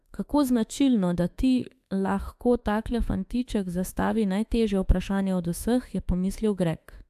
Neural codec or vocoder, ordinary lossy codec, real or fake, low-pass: autoencoder, 48 kHz, 32 numbers a frame, DAC-VAE, trained on Japanese speech; AAC, 96 kbps; fake; 14.4 kHz